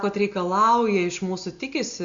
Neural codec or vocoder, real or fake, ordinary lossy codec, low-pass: none; real; Opus, 64 kbps; 7.2 kHz